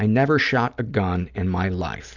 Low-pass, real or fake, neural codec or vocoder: 7.2 kHz; real; none